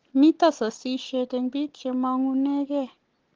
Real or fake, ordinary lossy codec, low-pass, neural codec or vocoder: real; Opus, 16 kbps; 7.2 kHz; none